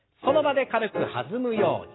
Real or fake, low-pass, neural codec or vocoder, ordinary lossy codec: real; 7.2 kHz; none; AAC, 16 kbps